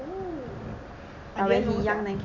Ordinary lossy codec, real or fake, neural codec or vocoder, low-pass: none; real; none; 7.2 kHz